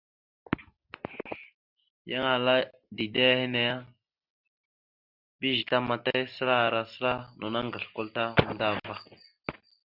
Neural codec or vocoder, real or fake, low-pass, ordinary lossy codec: none; real; 5.4 kHz; Opus, 64 kbps